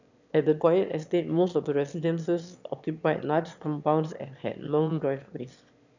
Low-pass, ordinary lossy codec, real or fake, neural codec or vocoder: 7.2 kHz; none; fake; autoencoder, 22.05 kHz, a latent of 192 numbers a frame, VITS, trained on one speaker